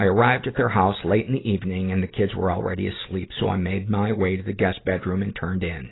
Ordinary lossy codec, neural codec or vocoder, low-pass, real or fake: AAC, 16 kbps; none; 7.2 kHz; real